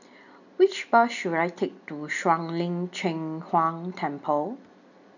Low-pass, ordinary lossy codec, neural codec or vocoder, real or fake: 7.2 kHz; none; none; real